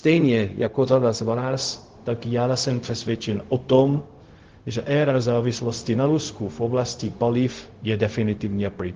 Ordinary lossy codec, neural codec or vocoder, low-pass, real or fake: Opus, 16 kbps; codec, 16 kHz, 0.4 kbps, LongCat-Audio-Codec; 7.2 kHz; fake